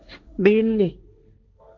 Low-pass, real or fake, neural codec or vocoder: 7.2 kHz; fake; codec, 16 kHz, 1.1 kbps, Voila-Tokenizer